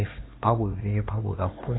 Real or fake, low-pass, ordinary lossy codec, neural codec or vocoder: fake; 7.2 kHz; AAC, 16 kbps; codec, 16 kHz, 4 kbps, X-Codec, HuBERT features, trained on LibriSpeech